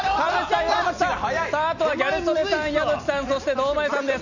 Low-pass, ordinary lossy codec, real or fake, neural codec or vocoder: 7.2 kHz; none; real; none